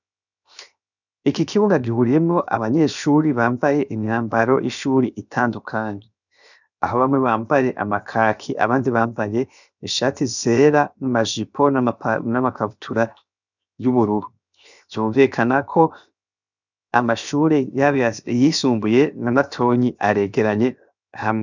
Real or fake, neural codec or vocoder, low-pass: fake; codec, 16 kHz, 0.7 kbps, FocalCodec; 7.2 kHz